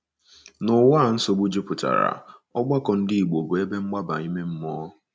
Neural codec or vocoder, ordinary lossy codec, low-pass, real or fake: none; none; none; real